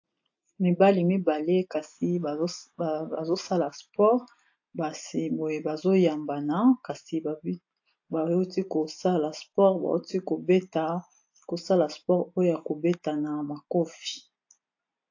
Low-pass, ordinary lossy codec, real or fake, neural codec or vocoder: 7.2 kHz; AAC, 48 kbps; real; none